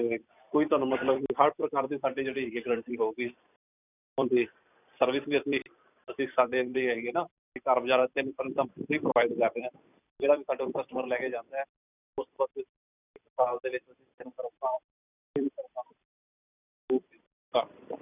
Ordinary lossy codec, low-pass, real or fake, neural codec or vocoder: none; 3.6 kHz; fake; vocoder, 44.1 kHz, 128 mel bands every 256 samples, BigVGAN v2